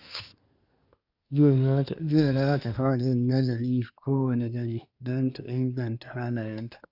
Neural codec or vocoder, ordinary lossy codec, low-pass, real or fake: codec, 24 kHz, 1 kbps, SNAC; none; 5.4 kHz; fake